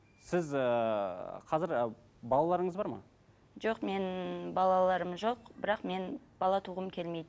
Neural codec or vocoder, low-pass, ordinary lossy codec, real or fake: none; none; none; real